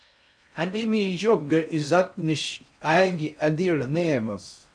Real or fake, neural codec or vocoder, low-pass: fake; codec, 16 kHz in and 24 kHz out, 0.6 kbps, FocalCodec, streaming, 4096 codes; 9.9 kHz